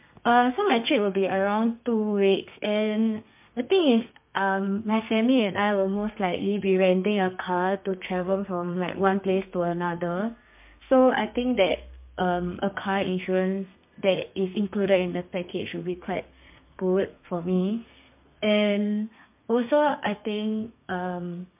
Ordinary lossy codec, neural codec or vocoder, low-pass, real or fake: MP3, 32 kbps; codec, 32 kHz, 1.9 kbps, SNAC; 3.6 kHz; fake